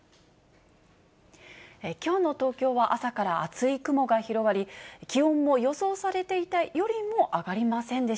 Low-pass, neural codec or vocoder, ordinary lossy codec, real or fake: none; none; none; real